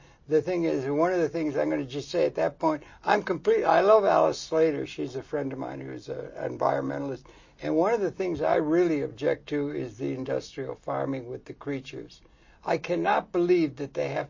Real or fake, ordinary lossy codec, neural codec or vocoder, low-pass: real; MP3, 32 kbps; none; 7.2 kHz